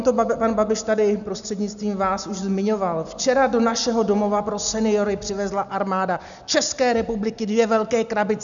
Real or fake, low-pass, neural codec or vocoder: real; 7.2 kHz; none